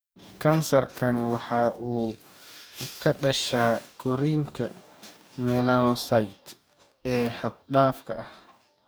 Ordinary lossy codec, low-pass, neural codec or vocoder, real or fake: none; none; codec, 44.1 kHz, 2.6 kbps, DAC; fake